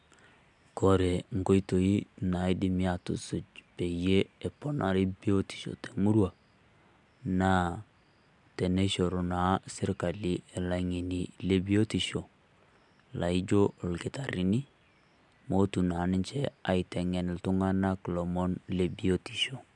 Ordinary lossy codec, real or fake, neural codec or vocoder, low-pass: MP3, 96 kbps; real; none; 10.8 kHz